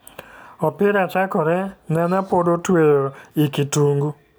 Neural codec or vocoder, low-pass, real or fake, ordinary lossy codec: none; none; real; none